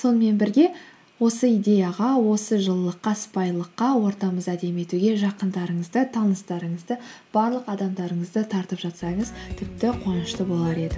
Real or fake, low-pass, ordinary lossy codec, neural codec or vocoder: real; none; none; none